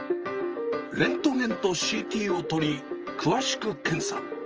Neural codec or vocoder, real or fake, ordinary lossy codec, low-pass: vocoder, 44.1 kHz, 128 mel bands, Pupu-Vocoder; fake; Opus, 24 kbps; 7.2 kHz